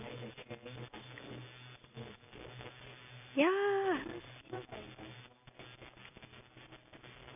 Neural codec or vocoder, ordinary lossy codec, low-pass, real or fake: none; none; 3.6 kHz; real